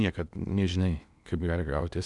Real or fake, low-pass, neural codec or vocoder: fake; 10.8 kHz; codec, 16 kHz in and 24 kHz out, 0.8 kbps, FocalCodec, streaming, 65536 codes